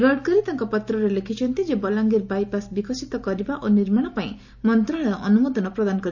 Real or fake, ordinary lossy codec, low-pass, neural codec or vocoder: real; none; 7.2 kHz; none